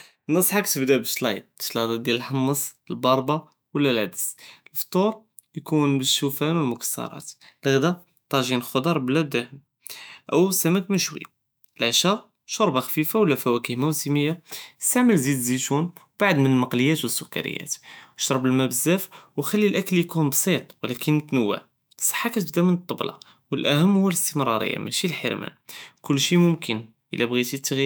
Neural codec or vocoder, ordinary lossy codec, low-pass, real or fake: autoencoder, 48 kHz, 128 numbers a frame, DAC-VAE, trained on Japanese speech; none; none; fake